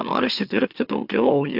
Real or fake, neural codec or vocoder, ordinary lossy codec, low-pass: fake; autoencoder, 44.1 kHz, a latent of 192 numbers a frame, MeloTTS; AAC, 48 kbps; 5.4 kHz